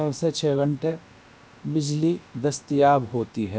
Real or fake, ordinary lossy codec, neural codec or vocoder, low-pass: fake; none; codec, 16 kHz, about 1 kbps, DyCAST, with the encoder's durations; none